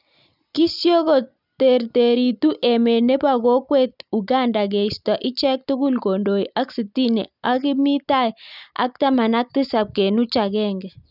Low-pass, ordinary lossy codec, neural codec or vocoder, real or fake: 5.4 kHz; none; none; real